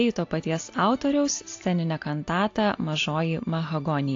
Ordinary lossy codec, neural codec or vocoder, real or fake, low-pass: AAC, 48 kbps; none; real; 7.2 kHz